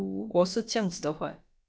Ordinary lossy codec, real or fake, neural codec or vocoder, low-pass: none; fake; codec, 16 kHz, about 1 kbps, DyCAST, with the encoder's durations; none